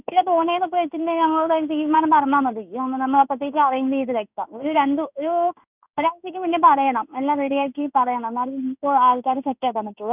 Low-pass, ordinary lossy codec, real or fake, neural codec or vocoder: 3.6 kHz; none; fake; codec, 16 kHz in and 24 kHz out, 1 kbps, XY-Tokenizer